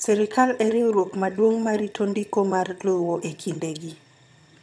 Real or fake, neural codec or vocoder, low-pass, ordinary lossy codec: fake; vocoder, 22.05 kHz, 80 mel bands, HiFi-GAN; none; none